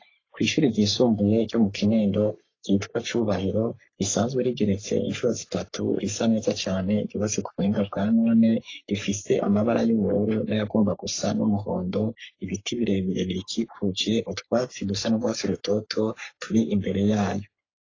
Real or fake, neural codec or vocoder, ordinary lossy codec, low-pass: fake; codec, 44.1 kHz, 3.4 kbps, Pupu-Codec; AAC, 32 kbps; 7.2 kHz